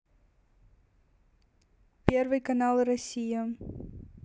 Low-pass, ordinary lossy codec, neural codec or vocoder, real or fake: none; none; none; real